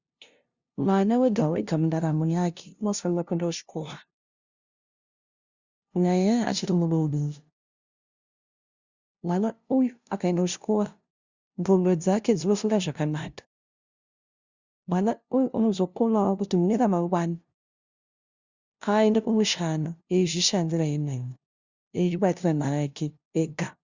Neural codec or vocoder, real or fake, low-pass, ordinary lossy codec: codec, 16 kHz, 0.5 kbps, FunCodec, trained on LibriTTS, 25 frames a second; fake; 7.2 kHz; Opus, 64 kbps